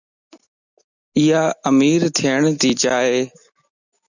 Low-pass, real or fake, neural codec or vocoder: 7.2 kHz; real; none